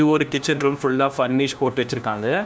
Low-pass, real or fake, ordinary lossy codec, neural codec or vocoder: none; fake; none; codec, 16 kHz, 1 kbps, FunCodec, trained on LibriTTS, 50 frames a second